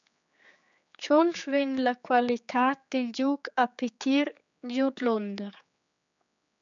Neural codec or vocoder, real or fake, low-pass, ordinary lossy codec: codec, 16 kHz, 4 kbps, X-Codec, HuBERT features, trained on general audio; fake; 7.2 kHz; MP3, 96 kbps